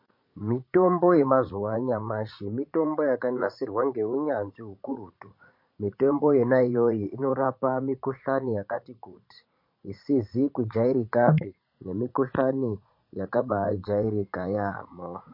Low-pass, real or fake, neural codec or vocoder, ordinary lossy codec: 5.4 kHz; fake; vocoder, 44.1 kHz, 80 mel bands, Vocos; MP3, 32 kbps